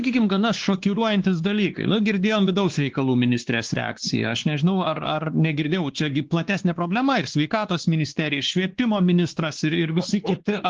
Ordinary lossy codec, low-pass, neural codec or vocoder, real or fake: Opus, 16 kbps; 7.2 kHz; codec, 16 kHz, 2 kbps, X-Codec, WavLM features, trained on Multilingual LibriSpeech; fake